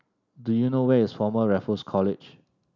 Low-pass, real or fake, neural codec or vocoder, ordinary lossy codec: 7.2 kHz; real; none; none